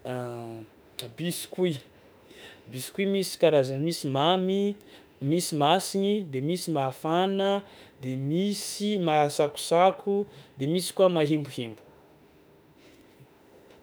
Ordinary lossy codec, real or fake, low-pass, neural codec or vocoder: none; fake; none; autoencoder, 48 kHz, 32 numbers a frame, DAC-VAE, trained on Japanese speech